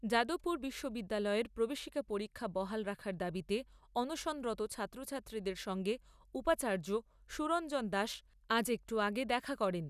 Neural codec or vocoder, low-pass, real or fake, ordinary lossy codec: none; 14.4 kHz; real; none